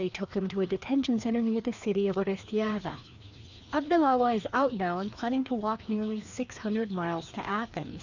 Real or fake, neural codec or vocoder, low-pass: fake; codec, 16 kHz, 2 kbps, FreqCodec, larger model; 7.2 kHz